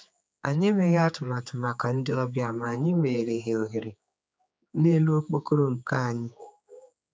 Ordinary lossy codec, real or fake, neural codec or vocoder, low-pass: none; fake; codec, 16 kHz, 4 kbps, X-Codec, HuBERT features, trained on general audio; none